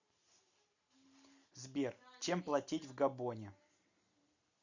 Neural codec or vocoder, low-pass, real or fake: none; 7.2 kHz; real